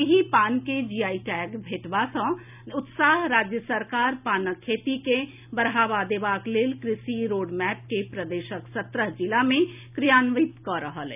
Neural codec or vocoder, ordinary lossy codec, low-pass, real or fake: none; none; 3.6 kHz; real